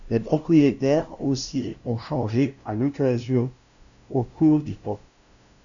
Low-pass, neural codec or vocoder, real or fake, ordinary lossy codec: 7.2 kHz; codec, 16 kHz, 0.5 kbps, FunCodec, trained on LibriTTS, 25 frames a second; fake; MP3, 96 kbps